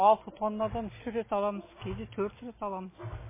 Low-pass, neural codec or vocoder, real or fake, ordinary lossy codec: 3.6 kHz; none; real; MP3, 16 kbps